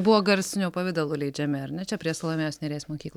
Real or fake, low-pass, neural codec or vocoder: real; 19.8 kHz; none